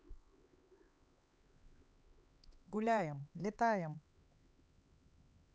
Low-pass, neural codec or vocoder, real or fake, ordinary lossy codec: none; codec, 16 kHz, 4 kbps, X-Codec, HuBERT features, trained on LibriSpeech; fake; none